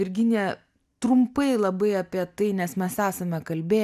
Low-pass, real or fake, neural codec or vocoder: 14.4 kHz; real; none